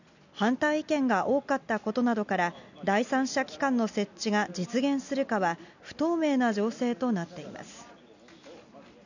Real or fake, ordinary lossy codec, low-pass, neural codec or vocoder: real; none; 7.2 kHz; none